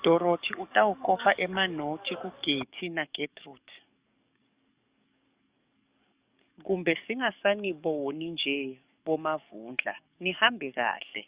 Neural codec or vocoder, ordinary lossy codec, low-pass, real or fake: codec, 44.1 kHz, 7.8 kbps, DAC; Opus, 64 kbps; 3.6 kHz; fake